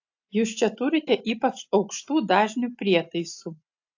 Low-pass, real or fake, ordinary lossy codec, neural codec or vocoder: 7.2 kHz; real; AAC, 48 kbps; none